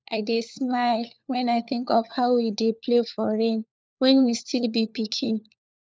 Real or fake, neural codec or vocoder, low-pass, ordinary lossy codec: fake; codec, 16 kHz, 4 kbps, FunCodec, trained on LibriTTS, 50 frames a second; none; none